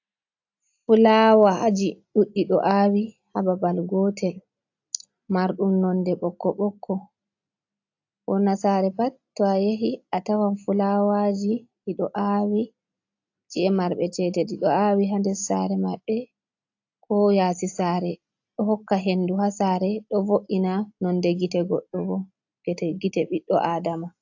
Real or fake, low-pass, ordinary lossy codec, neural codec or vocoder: real; 7.2 kHz; AAC, 48 kbps; none